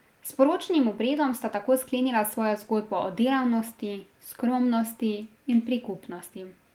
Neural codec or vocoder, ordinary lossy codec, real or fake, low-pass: none; Opus, 24 kbps; real; 19.8 kHz